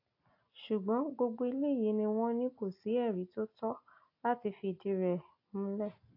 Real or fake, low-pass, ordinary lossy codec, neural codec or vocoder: real; 5.4 kHz; AAC, 32 kbps; none